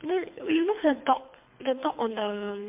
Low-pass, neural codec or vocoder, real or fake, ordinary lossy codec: 3.6 kHz; codec, 24 kHz, 3 kbps, HILCodec; fake; MP3, 32 kbps